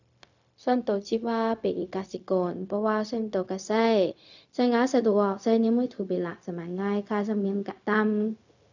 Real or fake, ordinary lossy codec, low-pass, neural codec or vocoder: fake; none; 7.2 kHz; codec, 16 kHz, 0.4 kbps, LongCat-Audio-Codec